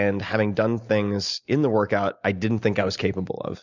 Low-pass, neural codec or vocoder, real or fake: 7.2 kHz; none; real